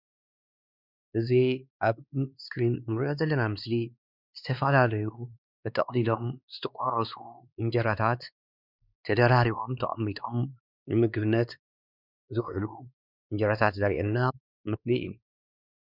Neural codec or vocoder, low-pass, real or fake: codec, 16 kHz, 2 kbps, X-Codec, WavLM features, trained on Multilingual LibriSpeech; 5.4 kHz; fake